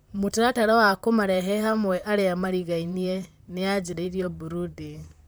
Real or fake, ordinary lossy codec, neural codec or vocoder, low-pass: fake; none; vocoder, 44.1 kHz, 128 mel bands, Pupu-Vocoder; none